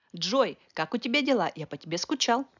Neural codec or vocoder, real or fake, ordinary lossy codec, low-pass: none; real; none; 7.2 kHz